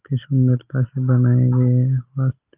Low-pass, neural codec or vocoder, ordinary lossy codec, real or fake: 3.6 kHz; none; Opus, 24 kbps; real